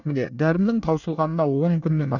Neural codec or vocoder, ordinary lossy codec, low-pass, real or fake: codec, 24 kHz, 1 kbps, SNAC; none; 7.2 kHz; fake